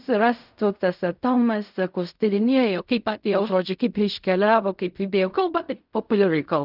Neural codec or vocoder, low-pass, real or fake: codec, 16 kHz in and 24 kHz out, 0.4 kbps, LongCat-Audio-Codec, fine tuned four codebook decoder; 5.4 kHz; fake